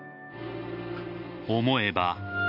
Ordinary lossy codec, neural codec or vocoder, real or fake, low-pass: none; none; real; 5.4 kHz